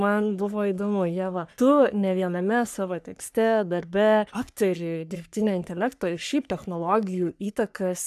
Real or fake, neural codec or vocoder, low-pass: fake; codec, 44.1 kHz, 3.4 kbps, Pupu-Codec; 14.4 kHz